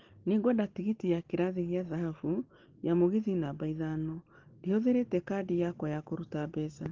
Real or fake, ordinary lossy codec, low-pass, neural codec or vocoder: real; Opus, 16 kbps; 7.2 kHz; none